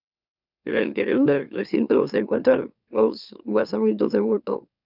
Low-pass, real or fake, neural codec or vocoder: 5.4 kHz; fake; autoencoder, 44.1 kHz, a latent of 192 numbers a frame, MeloTTS